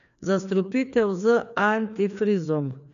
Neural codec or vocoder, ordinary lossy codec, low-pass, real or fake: codec, 16 kHz, 2 kbps, FreqCodec, larger model; none; 7.2 kHz; fake